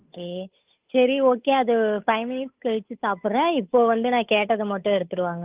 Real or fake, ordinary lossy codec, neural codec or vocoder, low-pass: fake; Opus, 32 kbps; codec, 16 kHz, 8 kbps, FunCodec, trained on Chinese and English, 25 frames a second; 3.6 kHz